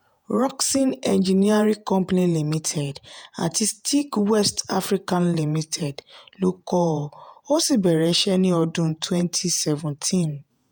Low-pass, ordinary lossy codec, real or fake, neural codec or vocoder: none; none; fake; vocoder, 48 kHz, 128 mel bands, Vocos